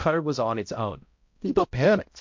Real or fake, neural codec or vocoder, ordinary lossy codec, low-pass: fake; codec, 16 kHz, 0.5 kbps, X-Codec, HuBERT features, trained on balanced general audio; MP3, 48 kbps; 7.2 kHz